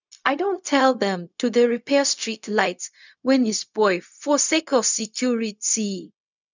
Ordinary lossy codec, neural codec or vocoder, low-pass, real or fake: none; codec, 16 kHz, 0.4 kbps, LongCat-Audio-Codec; 7.2 kHz; fake